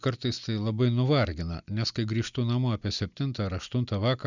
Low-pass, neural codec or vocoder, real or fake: 7.2 kHz; none; real